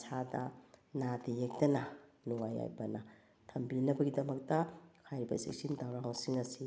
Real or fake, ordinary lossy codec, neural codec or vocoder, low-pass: real; none; none; none